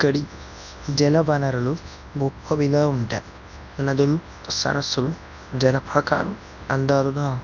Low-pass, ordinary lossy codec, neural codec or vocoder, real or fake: 7.2 kHz; none; codec, 24 kHz, 0.9 kbps, WavTokenizer, large speech release; fake